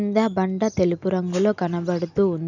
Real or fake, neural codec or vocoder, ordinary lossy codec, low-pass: real; none; none; 7.2 kHz